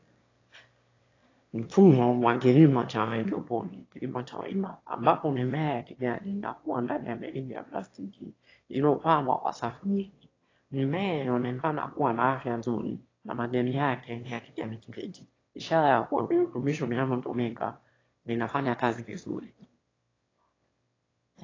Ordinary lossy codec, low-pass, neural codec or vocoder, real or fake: AAC, 32 kbps; 7.2 kHz; autoencoder, 22.05 kHz, a latent of 192 numbers a frame, VITS, trained on one speaker; fake